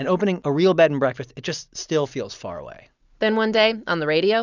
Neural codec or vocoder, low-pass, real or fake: none; 7.2 kHz; real